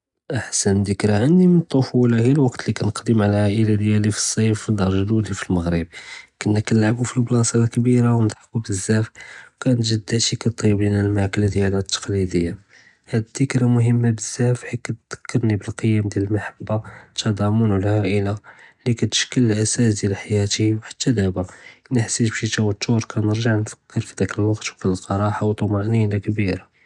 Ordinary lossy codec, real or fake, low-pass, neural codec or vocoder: none; real; 10.8 kHz; none